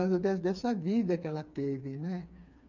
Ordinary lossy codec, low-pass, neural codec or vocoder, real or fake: none; 7.2 kHz; codec, 16 kHz, 4 kbps, FreqCodec, smaller model; fake